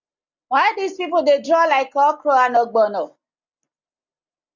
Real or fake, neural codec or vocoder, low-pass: real; none; 7.2 kHz